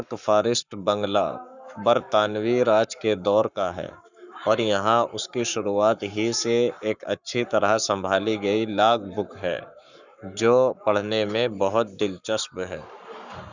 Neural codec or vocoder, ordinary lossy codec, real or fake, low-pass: codec, 44.1 kHz, 7.8 kbps, Pupu-Codec; none; fake; 7.2 kHz